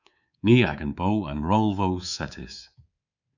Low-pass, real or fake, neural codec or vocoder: 7.2 kHz; fake; codec, 24 kHz, 3.1 kbps, DualCodec